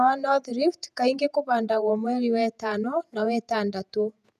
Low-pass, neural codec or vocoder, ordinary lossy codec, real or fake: 14.4 kHz; vocoder, 48 kHz, 128 mel bands, Vocos; none; fake